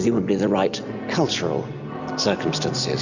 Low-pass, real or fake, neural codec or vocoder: 7.2 kHz; real; none